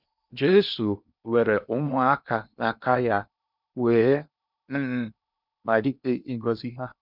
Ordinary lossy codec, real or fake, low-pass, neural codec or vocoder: none; fake; 5.4 kHz; codec, 16 kHz in and 24 kHz out, 0.8 kbps, FocalCodec, streaming, 65536 codes